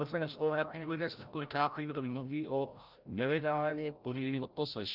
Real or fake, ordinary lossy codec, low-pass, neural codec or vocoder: fake; Opus, 24 kbps; 5.4 kHz; codec, 16 kHz, 0.5 kbps, FreqCodec, larger model